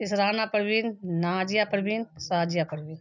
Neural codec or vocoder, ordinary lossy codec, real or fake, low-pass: none; none; real; 7.2 kHz